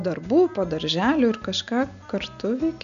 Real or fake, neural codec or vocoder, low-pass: real; none; 7.2 kHz